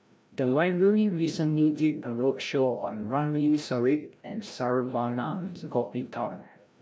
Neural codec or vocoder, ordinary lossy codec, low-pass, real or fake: codec, 16 kHz, 0.5 kbps, FreqCodec, larger model; none; none; fake